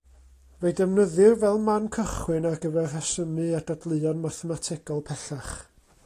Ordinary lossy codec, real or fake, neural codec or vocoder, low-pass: AAC, 48 kbps; real; none; 14.4 kHz